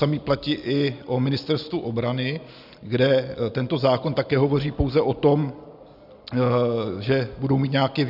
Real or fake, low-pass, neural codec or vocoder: real; 5.4 kHz; none